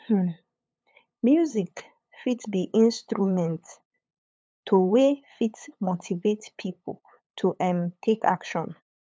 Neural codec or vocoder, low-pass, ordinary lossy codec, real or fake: codec, 16 kHz, 8 kbps, FunCodec, trained on LibriTTS, 25 frames a second; none; none; fake